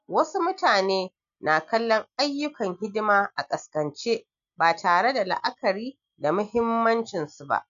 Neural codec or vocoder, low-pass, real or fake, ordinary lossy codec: none; 7.2 kHz; real; none